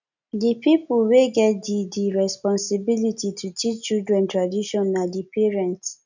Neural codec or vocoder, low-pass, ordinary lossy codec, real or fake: none; 7.2 kHz; none; real